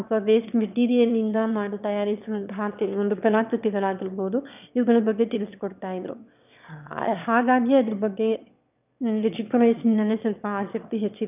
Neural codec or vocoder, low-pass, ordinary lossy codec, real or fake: autoencoder, 22.05 kHz, a latent of 192 numbers a frame, VITS, trained on one speaker; 3.6 kHz; none; fake